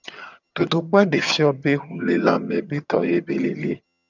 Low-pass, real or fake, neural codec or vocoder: 7.2 kHz; fake; vocoder, 22.05 kHz, 80 mel bands, HiFi-GAN